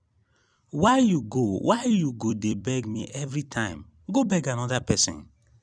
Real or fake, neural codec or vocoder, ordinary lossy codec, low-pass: fake; vocoder, 44.1 kHz, 128 mel bands every 256 samples, BigVGAN v2; none; 9.9 kHz